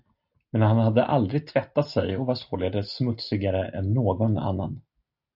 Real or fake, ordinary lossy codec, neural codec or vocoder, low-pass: real; AAC, 48 kbps; none; 5.4 kHz